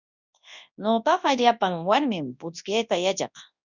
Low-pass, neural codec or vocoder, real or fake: 7.2 kHz; codec, 24 kHz, 0.9 kbps, WavTokenizer, large speech release; fake